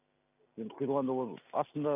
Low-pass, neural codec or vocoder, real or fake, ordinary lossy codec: 3.6 kHz; none; real; none